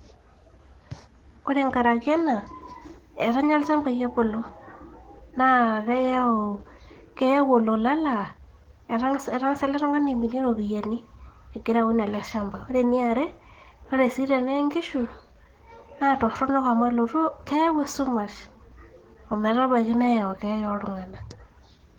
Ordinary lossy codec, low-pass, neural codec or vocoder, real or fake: Opus, 16 kbps; 19.8 kHz; codec, 44.1 kHz, 7.8 kbps, DAC; fake